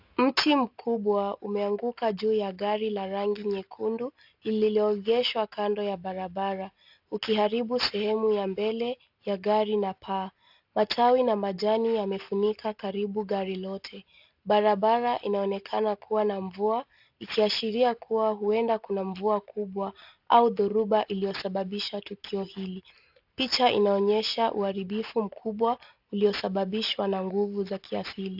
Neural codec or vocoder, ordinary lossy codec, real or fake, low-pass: none; AAC, 48 kbps; real; 5.4 kHz